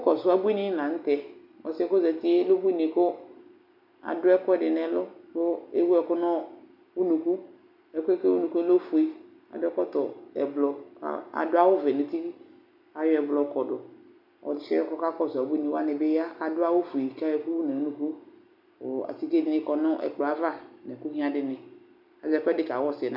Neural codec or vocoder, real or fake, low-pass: none; real; 5.4 kHz